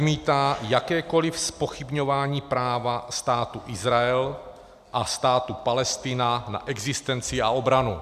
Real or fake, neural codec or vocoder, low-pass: real; none; 14.4 kHz